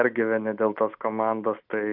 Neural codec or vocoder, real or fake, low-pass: none; real; 5.4 kHz